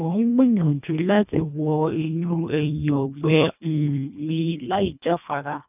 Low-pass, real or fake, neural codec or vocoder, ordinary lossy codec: 3.6 kHz; fake; codec, 24 kHz, 1.5 kbps, HILCodec; none